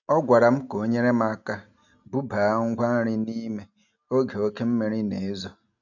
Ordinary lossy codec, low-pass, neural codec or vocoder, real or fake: none; 7.2 kHz; none; real